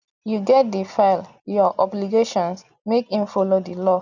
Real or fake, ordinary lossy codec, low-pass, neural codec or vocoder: real; none; 7.2 kHz; none